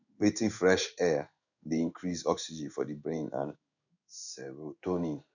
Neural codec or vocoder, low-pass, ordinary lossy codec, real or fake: codec, 16 kHz in and 24 kHz out, 1 kbps, XY-Tokenizer; 7.2 kHz; none; fake